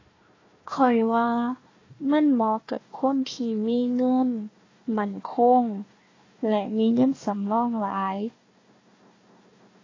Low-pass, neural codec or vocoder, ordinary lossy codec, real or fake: 7.2 kHz; codec, 16 kHz, 1 kbps, FunCodec, trained on Chinese and English, 50 frames a second; AAC, 32 kbps; fake